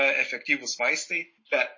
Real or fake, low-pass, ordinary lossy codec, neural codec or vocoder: real; 7.2 kHz; MP3, 32 kbps; none